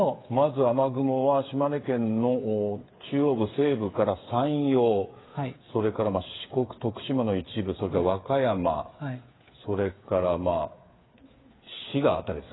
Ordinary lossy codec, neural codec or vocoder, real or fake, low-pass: AAC, 16 kbps; codec, 16 kHz, 8 kbps, FreqCodec, smaller model; fake; 7.2 kHz